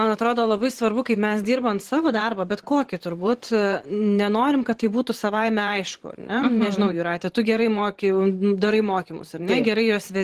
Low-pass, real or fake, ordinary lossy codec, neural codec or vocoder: 14.4 kHz; fake; Opus, 16 kbps; vocoder, 44.1 kHz, 128 mel bands every 512 samples, BigVGAN v2